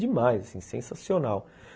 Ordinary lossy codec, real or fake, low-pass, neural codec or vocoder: none; real; none; none